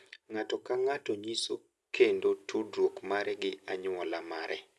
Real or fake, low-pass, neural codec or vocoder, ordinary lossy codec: real; none; none; none